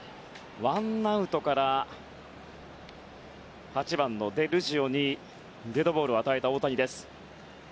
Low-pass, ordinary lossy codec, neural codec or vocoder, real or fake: none; none; none; real